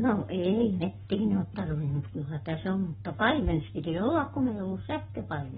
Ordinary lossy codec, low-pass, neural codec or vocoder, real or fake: AAC, 16 kbps; 19.8 kHz; codec, 44.1 kHz, 7.8 kbps, Pupu-Codec; fake